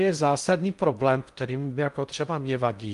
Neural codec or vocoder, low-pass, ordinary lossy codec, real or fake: codec, 16 kHz in and 24 kHz out, 0.6 kbps, FocalCodec, streaming, 2048 codes; 10.8 kHz; Opus, 32 kbps; fake